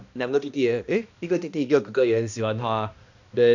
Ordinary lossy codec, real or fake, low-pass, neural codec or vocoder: none; fake; 7.2 kHz; codec, 16 kHz, 1 kbps, X-Codec, HuBERT features, trained on balanced general audio